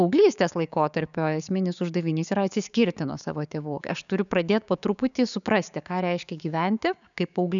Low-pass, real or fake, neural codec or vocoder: 7.2 kHz; fake; codec, 16 kHz, 6 kbps, DAC